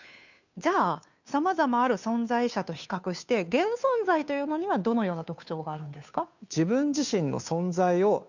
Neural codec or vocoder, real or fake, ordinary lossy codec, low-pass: codec, 16 kHz, 2 kbps, FunCodec, trained on Chinese and English, 25 frames a second; fake; none; 7.2 kHz